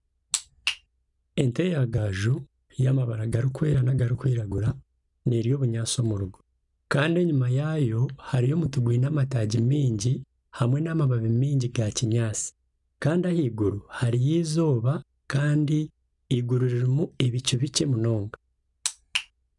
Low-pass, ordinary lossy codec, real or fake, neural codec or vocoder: 10.8 kHz; none; real; none